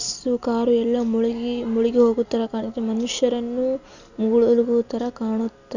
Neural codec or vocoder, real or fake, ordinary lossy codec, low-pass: none; real; none; 7.2 kHz